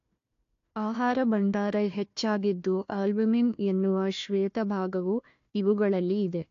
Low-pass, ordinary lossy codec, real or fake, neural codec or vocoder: 7.2 kHz; AAC, 48 kbps; fake; codec, 16 kHz, 1 kbps, FunCodec, trained on Chinese and English, 50 frames a second